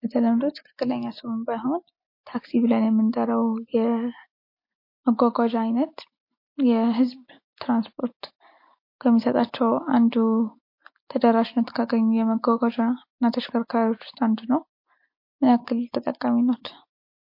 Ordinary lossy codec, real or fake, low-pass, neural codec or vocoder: MP3, 32 kbps; real; 5.4 kHz; none